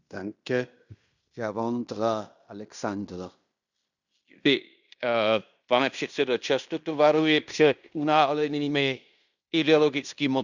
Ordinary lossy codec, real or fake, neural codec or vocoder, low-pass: none; fake; codec, 16 kHz in and 24 kHz out, 0.9 kbps, LongCat-Audio-Codec, fine tuned four codebook decoder; 7.2 kHz